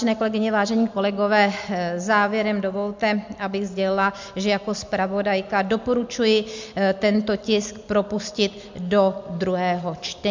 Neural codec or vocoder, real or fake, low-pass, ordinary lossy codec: none; real; 7.2 kHz; MP3, 64 kbps